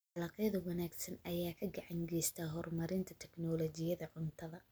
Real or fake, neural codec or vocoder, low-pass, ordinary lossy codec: real; none; none; none